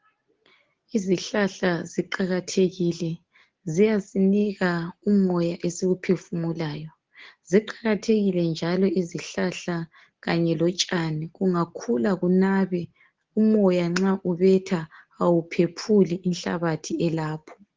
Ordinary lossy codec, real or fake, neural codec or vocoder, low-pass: Opus, 16 kbps; real; none; 7.2 kHz